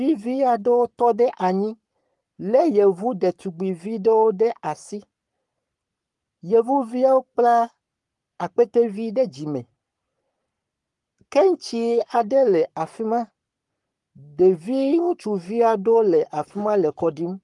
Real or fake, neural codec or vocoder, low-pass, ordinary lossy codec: fake; codec, 44.1 kHz, 7.8 kbps, Pupu-Codec; 10.8 kHz; Opus, 32 kbps